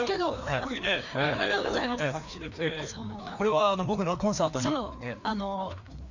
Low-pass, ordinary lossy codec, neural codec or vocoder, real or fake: 7.2 kHz; none; codec, 16 kHz, 2 kbps, FreqCodec, larger model; fake